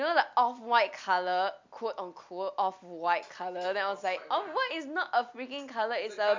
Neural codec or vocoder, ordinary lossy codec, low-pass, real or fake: none; MP3, 64 kbps; 7.2 kHz; real